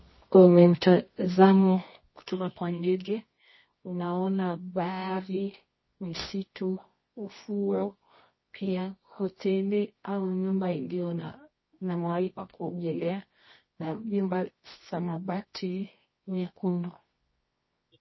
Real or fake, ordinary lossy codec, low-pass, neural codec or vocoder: fake; MP3, 24 kbps; 7.2 kHz; codec, 24 kHz, 0.9 kbps, WavTokenizer, medium music audio release